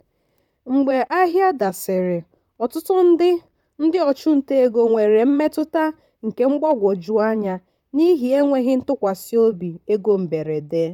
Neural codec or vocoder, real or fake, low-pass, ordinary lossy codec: vocoder, 44.1 kHz, 128 mel bands, Pupu-Vocoder; fake; 19.8 kHz; none